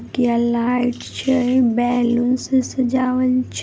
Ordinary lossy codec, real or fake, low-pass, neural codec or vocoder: none; real; none; none